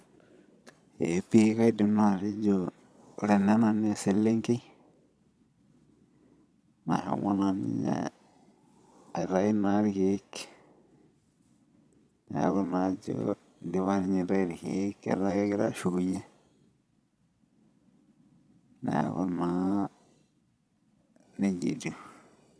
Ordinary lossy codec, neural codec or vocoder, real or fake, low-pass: none; vocoder, 22.05 kHz, 80 mel bands, Vocos; fake; none